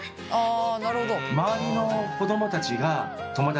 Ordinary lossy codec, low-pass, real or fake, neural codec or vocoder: none; none; real; none